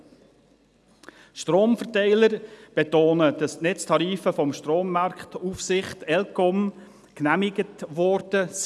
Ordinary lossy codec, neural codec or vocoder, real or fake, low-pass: none; none; real; none